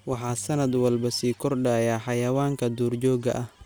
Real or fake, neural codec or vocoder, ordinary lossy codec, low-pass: real; none; none; none